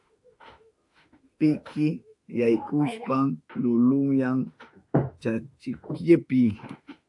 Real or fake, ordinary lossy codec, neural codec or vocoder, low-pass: fake; AAC, 64 kbps; autoencoder, 48 kHz, 32 numbers a frame, DAC-VAE, trained on Japanese speech; 10.8 kHz